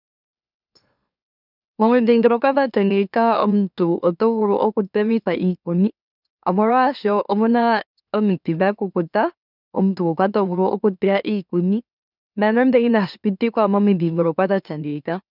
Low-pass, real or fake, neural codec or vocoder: 5.4 kHz; fake; autoencoder, 44.1 kHz, a latent of 192 numbers a frame, MeloTTS